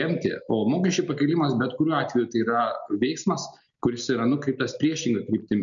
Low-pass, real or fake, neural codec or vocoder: 7.2 kHz; real; none